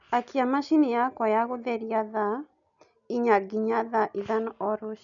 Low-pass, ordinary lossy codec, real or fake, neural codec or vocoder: 7.2 kHz; none; real; none